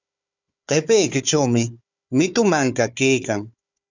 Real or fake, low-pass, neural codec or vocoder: fake; 7.2 kHz; codec, 16 kHz, 4 kbps, FunCodec, trained on Chinese and English, 50 frames a second